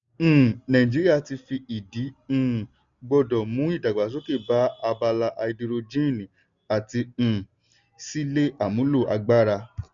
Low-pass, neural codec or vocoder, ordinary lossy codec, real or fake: 7.2 kHz; none; none; real